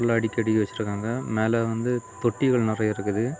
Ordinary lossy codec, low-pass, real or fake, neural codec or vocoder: none; none; real; none